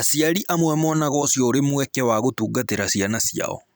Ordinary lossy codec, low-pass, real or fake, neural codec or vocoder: none; none; fake; vocoder, 44.1 kHz, 128 mel bands every 256 samples, BigVGAN v2